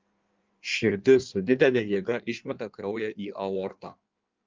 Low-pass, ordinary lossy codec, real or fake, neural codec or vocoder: 7.2 kHz; Opus, 32 kbps; fake; codec, 16 kHz in and 24 kHz out, 1.1 kbps, FireRedTTS-2 codec